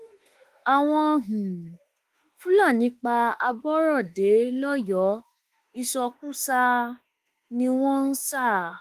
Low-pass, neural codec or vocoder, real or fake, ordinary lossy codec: 14.4 kHz; autoencoder, 48 kHz, 32 numbers a frame, DAC-VAE, trained on Japanese speech; fake; Opus, 24 kbps